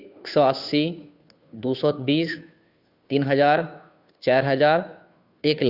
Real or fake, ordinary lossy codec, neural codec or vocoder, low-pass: fake; Opus, 64 kbps; codec, 16 kHz, 2 kbps, FunCodec, trained on Chinese and English, 25 frames a second; 5.4 kHz